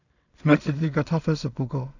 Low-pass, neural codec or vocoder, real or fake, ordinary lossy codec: 7.2 kHz; codec, 16 kHz in and 24 kHz out, 0.4 kbps, LongCat-Audio-Codec, two codebook decoder; fake; none